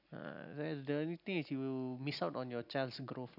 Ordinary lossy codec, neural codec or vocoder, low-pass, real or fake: none; none; 5.4 kHz; real